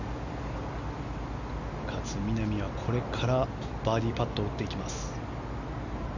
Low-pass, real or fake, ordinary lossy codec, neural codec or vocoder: 7.2 kHz; real; none; none